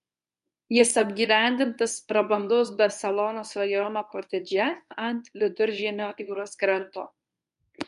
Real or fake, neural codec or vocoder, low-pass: fake; codec, 24 kHz, 0.9 kbps, WavTokenizer, medium speech release version 1; 10.8 kHz